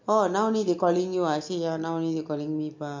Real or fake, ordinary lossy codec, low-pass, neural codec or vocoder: real; MP3, 48 kbps; 7.2 kHz; none